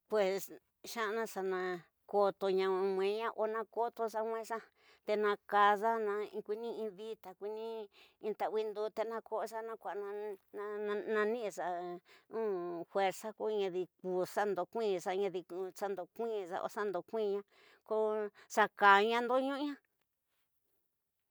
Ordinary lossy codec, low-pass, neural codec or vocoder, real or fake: none; none; none; real